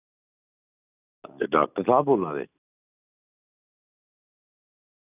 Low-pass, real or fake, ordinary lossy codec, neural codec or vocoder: 3.6 kHz; real; none; none